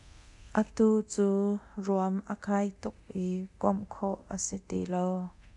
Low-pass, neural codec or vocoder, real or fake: 10.8 kHz; codec, 24 kHz, 0.9 kbps, DualCodec; fake